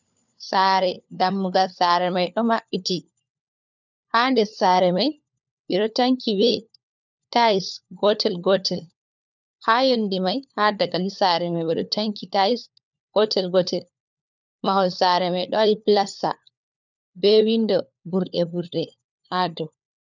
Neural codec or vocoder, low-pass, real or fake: codec, 16 kHz, 4 kbps, FunCodec, trained on LibriTTS, 50 frames a second; 7.2 kHz; fake